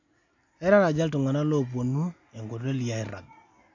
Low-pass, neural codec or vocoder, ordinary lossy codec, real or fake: 7.2 kHz; none; AAC, 48 kbps; real